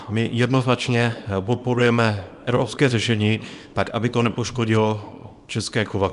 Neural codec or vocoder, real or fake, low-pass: codec, 24 kHz, 0.9 kbps, WavTokenizer, small release; fake; 10.8 kHz